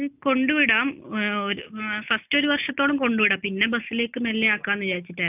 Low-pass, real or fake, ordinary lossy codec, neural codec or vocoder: 3.6 kHz; real; none; none